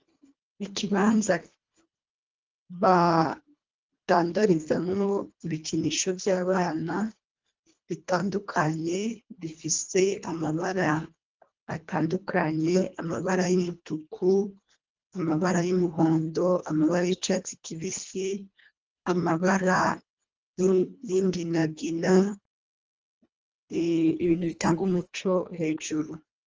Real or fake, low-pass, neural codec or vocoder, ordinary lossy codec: fake; 7.2 kHz; codec, 24 kHz, 1.5 kbps, HILCodec; Opus, 24 kbps